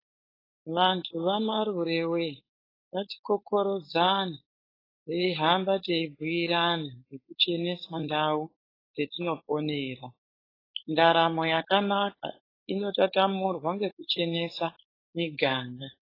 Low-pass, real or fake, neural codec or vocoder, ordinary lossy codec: 5.4 kHz; fake; codec, 16 kHz, 4.8 kbps, FACodec; AAC, 24 kbps